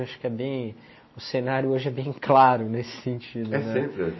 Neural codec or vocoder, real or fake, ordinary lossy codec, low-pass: none; real; MP3, 24 kbps; 7.2 kHz